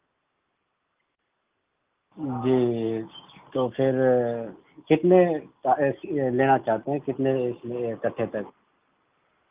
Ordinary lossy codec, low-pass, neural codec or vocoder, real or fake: Opus, 24 kbps; 3.6 kHz; none; real